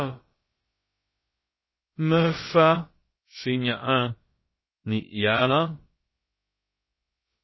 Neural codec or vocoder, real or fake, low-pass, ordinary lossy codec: codec, 16 kHz, about 1 kbps, DyCAST, with the encoder's durations; fake; 7.2 kHz; MP3, 24 kbps